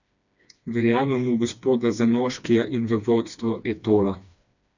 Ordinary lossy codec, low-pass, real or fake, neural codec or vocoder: none; 7.2 kHz; fake; codec, 16 kHz, 2 kbps, FreqCodec, smaller model